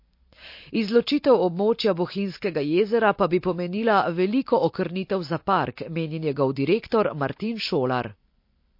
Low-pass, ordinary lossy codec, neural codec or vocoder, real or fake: 5.4 kHz; MP3, 32 kbps; none; real